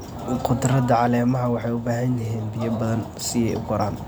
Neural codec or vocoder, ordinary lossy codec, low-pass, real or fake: none; none; none; real